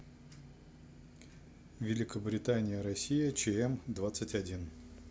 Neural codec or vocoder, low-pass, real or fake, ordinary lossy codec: none; none; real; none